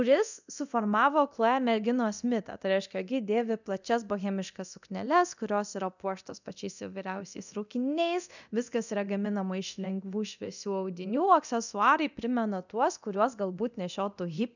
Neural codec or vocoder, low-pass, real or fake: codec, 24 kHz, 0.9 kbps, DualCodec; 7.2 kHz; fake